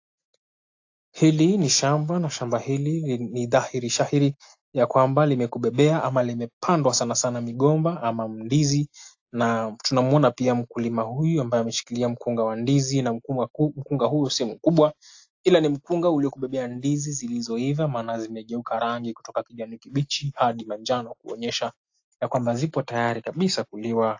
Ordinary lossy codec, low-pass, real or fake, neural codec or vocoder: AAC, 48 kbps; 7.2 kHz; real; none